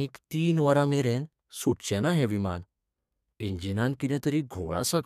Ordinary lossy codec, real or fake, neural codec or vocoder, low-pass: none; fake; codec, 32 kHz, 1.9 kbps, SNAC; 14.4 kHz